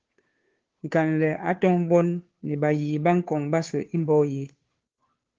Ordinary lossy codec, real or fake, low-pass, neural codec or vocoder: Opus, 16 kbps; fake; 7.2 kHz; codec, 16 kHz, 2 kbps, FunCodec, trained on Chinese and English, 25 frames a second